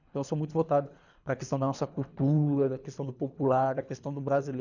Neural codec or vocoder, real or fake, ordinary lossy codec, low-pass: codec, 24 kHz, 3 kbps, HILCodec; fake; none; 7.2 kHz